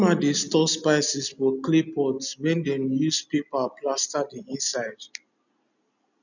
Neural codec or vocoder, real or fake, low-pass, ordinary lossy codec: none; real; 7.2 kHz; none